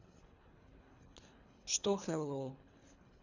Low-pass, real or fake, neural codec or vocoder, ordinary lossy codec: 7.2 kHz; fake; codec, 24 kHz, 3 kbps, HILCodec; none